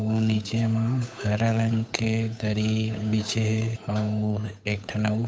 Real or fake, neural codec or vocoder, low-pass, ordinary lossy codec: fake; codec, 16 kHz, 8 kbps, FunCodec, trained on Chinese and English, 25 frames a second; none; none